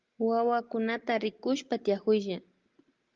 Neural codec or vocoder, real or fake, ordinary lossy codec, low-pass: none; real; Opus, 32 kbps; 7.2 kHz